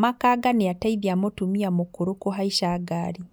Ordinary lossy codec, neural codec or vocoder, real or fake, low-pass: none; none; real; none